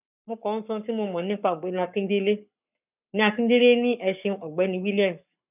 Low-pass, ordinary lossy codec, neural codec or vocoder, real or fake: 3.6 kHz; none; codec, 44.1 kHz, 7.8 kbps, Pupu-Codec; fake